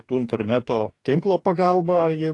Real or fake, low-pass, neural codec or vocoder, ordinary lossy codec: fake; 10.8 kHz; codec, 44.1 kHz, 2.6 kbps, DAC; AAC, 48 kbps